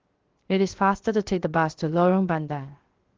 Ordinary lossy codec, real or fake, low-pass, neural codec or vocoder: Opus, 16 kbps; fake; 7.2 kHz; codec, 16 kHz, 0.7 kbps, FocalCodec